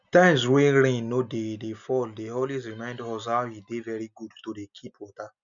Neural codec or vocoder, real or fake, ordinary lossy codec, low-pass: none; real; none; 7.2 kHz